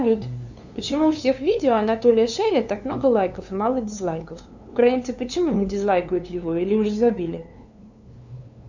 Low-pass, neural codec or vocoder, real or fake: 7.2 kHz; codec, 16 kHz, 2 kbps, FunCodec, trained on LibriTTS, 25 frames a second; fake